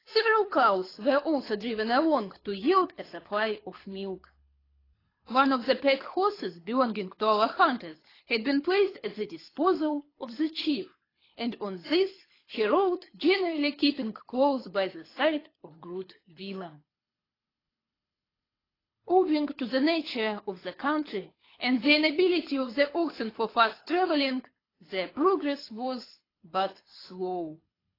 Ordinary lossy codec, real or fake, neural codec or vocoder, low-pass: AAC, 24 kbps; fake; codec, 24 kHz, 6 kbps, HILCodec; 5.4 kHz